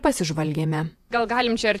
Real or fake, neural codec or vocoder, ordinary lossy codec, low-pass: fake; vocoder, 48 kHz, 128 mel bands, Vocos; AAC, 96 kbps; 14.4 kHz